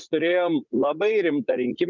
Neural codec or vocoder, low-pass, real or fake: vocoder, 44.1 kHz, 80 mel bands, Vocos; 7.2 kHz; fake